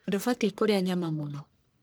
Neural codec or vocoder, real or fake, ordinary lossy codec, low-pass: codec, 44.1 kHz, 1.7 kbps, Pupu-Codec; fake; none; none